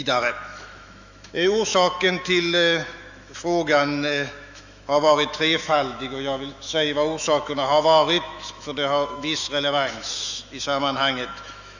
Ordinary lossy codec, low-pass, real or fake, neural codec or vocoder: none; 7.2 kHz; fake; autoencoder, 48 kHz, 128 numbers a frame, DAC-VAE, trained on Japanese speech